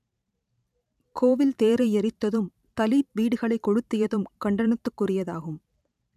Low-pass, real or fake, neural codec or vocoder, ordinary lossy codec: 14.4 kHz; fake; vocoder, 44.1 kHz, 128 mel bands every 512 samples, BigVGAN v2; none